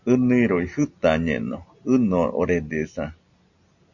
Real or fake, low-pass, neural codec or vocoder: real; 7.2 kHz; none